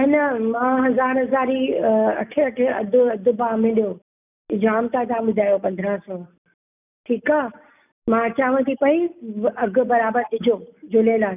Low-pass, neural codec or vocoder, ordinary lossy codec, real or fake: 3.6 kHz; none; none; real